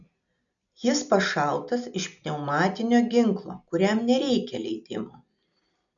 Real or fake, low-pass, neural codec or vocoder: real; 7.2 kHz; none